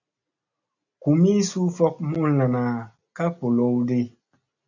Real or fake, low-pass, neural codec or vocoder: real; 7.2 kHz; none